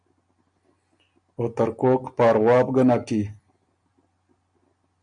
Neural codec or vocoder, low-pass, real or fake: none; 9.9 kHz; real